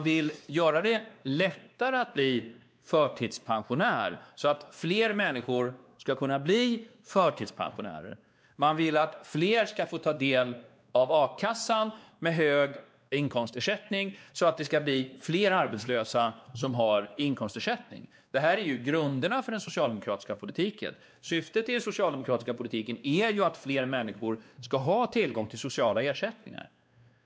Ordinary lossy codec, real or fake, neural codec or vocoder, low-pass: none; fake; codec, 16 kHz, 2 kbps, X-Codec, WavLM features, trained on Multilingual LibriSpeech; none